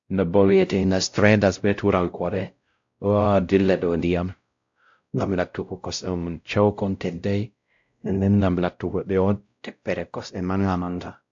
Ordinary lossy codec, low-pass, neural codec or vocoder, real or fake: AAC, 64 kbps; 7.2 kHz; codec, 16 kHz, 0.5 kbps, X-Codec, WavLM features, trained on Multilingual LibriSpeech; fake